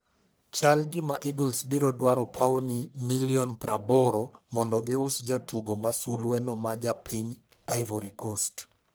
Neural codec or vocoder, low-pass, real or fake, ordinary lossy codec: codec, 44.1 kHz, 1.7 kbps, Pupu-Codec; none; fake; none